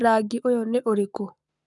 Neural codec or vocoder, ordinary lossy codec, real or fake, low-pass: codec, 44.1 kHz, 7.8 kbps, Pupu-Codec; none; fake; 10.8 kHz